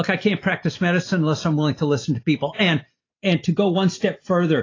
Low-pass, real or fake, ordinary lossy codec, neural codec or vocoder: 7.2 kHz; real; AAC, 32 kbps; none